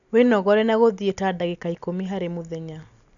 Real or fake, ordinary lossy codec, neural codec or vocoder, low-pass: real; none; none; 7.2 kHz